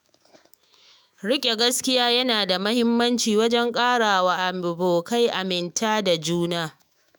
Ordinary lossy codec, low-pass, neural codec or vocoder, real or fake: none; none; autoencoder, 48 kHz, 128 numbers a frame, DAC-VAE, trained on Japanese speech; fake